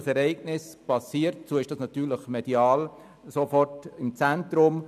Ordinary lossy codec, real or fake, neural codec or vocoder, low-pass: none; real; none; 14.4 kHz